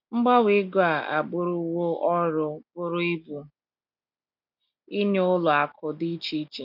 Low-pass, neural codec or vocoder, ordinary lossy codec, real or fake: 5.4 kHz; none; none; real